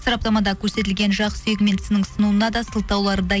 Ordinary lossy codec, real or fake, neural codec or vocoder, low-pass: none; real; none; none